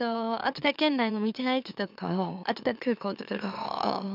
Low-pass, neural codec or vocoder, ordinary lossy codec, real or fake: 5.4 kHz; autoencoder, 44.1 kHz, a latent of 192 numbers a frame, MeloTTS; none; fake